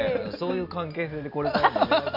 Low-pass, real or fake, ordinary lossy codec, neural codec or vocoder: 5.4 kHz; real; none; none